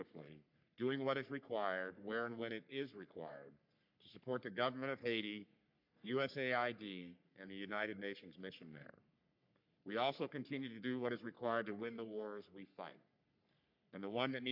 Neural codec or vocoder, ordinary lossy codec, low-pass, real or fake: codec, 44.1 kHz, 3.4 kbps, Pupu-Codec; MP3, 48 kbps; 5.4 kHz; fake